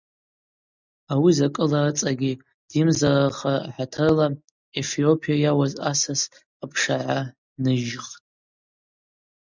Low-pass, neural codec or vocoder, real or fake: 7.2 kHz; none; real